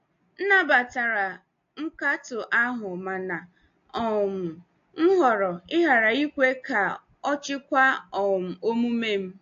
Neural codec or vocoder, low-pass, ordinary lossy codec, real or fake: none; 7.2 kHz; AAC, 48 kbps; real